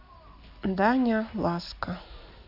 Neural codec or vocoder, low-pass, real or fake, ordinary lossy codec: none; 5.4 kHz; real; AAC, 48 kbps